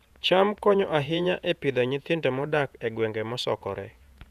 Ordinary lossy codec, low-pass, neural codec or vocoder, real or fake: none; 14.4 kHz; vocoder, 48 kHz, 128 mel bands, Vocos; fake